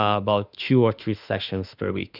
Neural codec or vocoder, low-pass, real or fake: autoencoder, 48 kHz, 32 numbers a frame, DAC-VAE, trained on Japanese speech; 5.4 kHz; fake